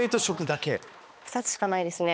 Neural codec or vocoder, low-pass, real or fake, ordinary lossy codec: codec, 16 kHz, 2 kbps, X-Codec, HuBERT features, trained on balanced general audio; none; fake; none